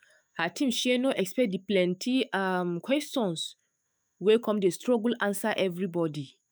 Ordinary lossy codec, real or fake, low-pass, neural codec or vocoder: none; fake; none; autoencoder, 48 kHz, 128 numbers a frame, DAC-VAE, trained on Japanese speech